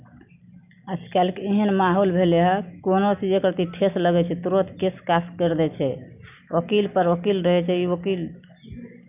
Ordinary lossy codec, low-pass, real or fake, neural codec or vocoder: none; 3.6 kHz; real; none